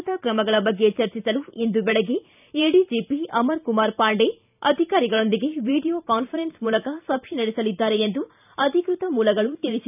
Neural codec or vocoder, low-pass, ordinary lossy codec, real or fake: vocoder, 44.1 kHz, 128 mel bands every 512 samples, BigVGAN v2; 3.6 kHz; none; fake